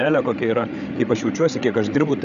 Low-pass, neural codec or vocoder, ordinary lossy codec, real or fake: 7.2 kHz; codec, 16 kHz, 8 kbps, FreqCodec, larger model; AAC, 96 kbps; fake